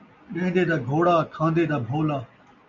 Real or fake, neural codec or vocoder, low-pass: real; none; 7.2 kHz